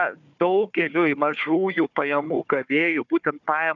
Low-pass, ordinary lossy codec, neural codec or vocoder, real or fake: 7.2 kHz; MP3, 96 kbps; codec, 16 kHz, 4 kbps, FunCodec, trained on Chinese and English, 50 frames a second; fake